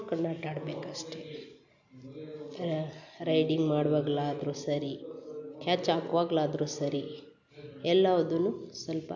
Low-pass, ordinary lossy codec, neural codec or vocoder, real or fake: 7.2 kHz; none; none; real